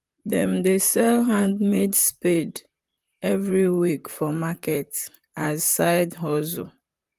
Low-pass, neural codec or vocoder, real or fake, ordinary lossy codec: 14.4 kHz; vocoder, 44.1 kHz, 128 mel bands, Pupu-Vocoder; fake; Opus, 32 kbps